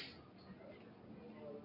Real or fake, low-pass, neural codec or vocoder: real; 5.4 kHz; none